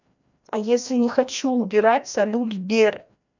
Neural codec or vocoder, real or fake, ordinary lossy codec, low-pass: codec, 16 kHz, 1 kbps, FreqCodec, larger model; fake; none; 7.2 kHz